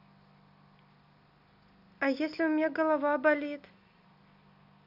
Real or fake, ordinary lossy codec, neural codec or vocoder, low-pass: real; none; none; 5.4 kHz